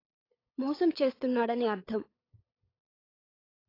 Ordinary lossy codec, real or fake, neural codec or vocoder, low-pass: AAC, 24 kbps; fake; codec, 16 kHz, 8 kbps, FunCodec, trained on LibriTTS, 25 frames a second; 5.4 kHz